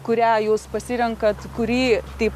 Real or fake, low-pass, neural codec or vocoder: real; 14.4 kHz; none